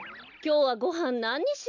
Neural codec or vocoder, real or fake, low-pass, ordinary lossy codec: none; real; 7.2 kHz; none